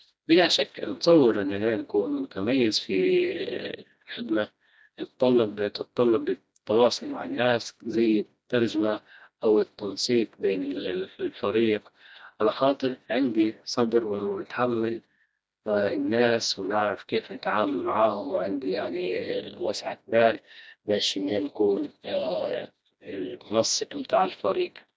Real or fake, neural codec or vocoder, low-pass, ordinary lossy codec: fake; codec, 16 kHz, 1 kbps, FreqCodec, smaller model; none; none